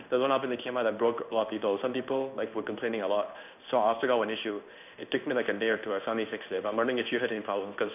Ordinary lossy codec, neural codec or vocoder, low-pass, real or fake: none; codec, 16 kHz in and 24 kHz out, 1 kbps, XY-Tokenizer; 3.6 kHz; fake